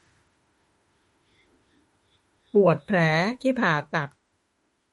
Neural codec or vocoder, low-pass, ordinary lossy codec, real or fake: autoencoder, 48 kHz, 32 numbers a frame, DAC-VAE, trained on Japanese speech; 19.8 kHz; MP3, 48 kbps; fake